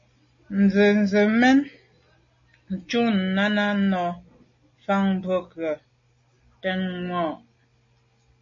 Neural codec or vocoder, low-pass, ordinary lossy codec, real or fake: none; 7.2 kHz; MP3, 32 kbps; real